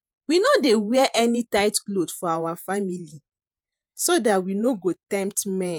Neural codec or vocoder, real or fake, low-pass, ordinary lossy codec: vocoder, 48 kHz, 128 mel bands, Vocos; fake; none; none